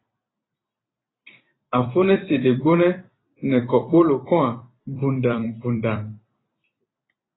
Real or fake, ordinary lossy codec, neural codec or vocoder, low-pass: fake; AAC, 16 kbps; vocoder, 44.1 kHz, 128 mel bands every 256 samples, BigVGAN v2; 7.2 kHz